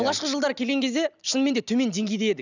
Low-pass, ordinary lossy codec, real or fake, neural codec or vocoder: 7.2 kHz; none; real; none